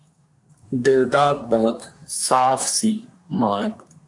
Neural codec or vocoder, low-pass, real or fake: codec, 24 kHz, 1 kbps, SNAC; 10.8 kHz; fake